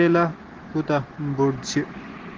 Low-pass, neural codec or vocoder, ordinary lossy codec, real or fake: 7.2 kHz; none; Opus, 16 kbps; real